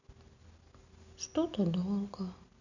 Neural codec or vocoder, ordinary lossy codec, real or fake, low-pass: none; none; real; 7.2 kHz